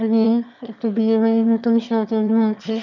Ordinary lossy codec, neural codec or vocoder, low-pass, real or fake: none; autoencoder, 22.05 kHz, a latent of 192 numbers a frame, VITS, trained on one speaker; 7.2 kHz; fake